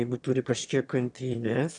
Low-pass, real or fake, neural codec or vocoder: 9.9 kHz; fake; autoencoder, 22.05 kHz, a latent of 192 numbers a frame, VITS, trained on one speaker